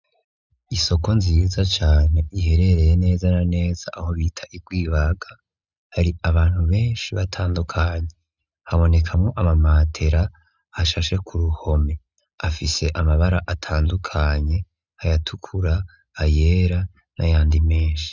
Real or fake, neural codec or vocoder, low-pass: real; none; 7.2 kHz